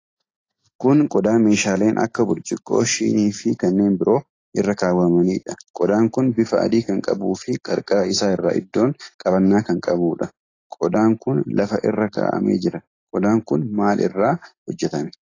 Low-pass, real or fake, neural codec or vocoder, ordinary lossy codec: 7.2 kHz; real; none; AAC, 32 kbps